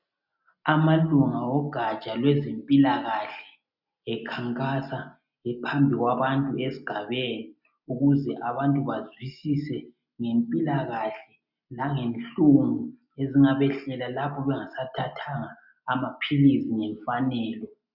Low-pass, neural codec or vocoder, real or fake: 5.4 kHz; none; real